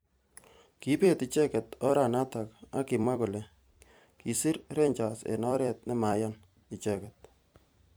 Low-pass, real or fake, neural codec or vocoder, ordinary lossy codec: none; fake; vocoder, 44.1 kHz, 128 mel bands every 256 samples, BigVGAN v2; none